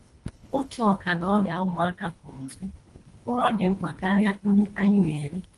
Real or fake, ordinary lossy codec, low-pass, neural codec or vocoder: fake; Opus, 32 kbps; 10.8 kHz; codec, 24 kHz, 1.5 kbps, HILCodec